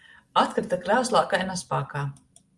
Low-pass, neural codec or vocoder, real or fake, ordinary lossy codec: 10.8 kHz; none; real; Opus, 32 kbps